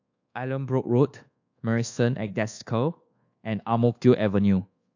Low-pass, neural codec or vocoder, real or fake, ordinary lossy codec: 7.2 kHz; codec, 24 kHz, 1.2 kbps, DualCodec; fake; AAC, 48 kbps